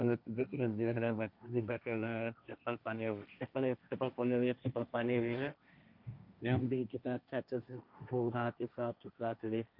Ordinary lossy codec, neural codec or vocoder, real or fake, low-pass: none; codec, 16 kHz, 1.1 kbps, Voila-Tokenizer; fake; 5.4 kHz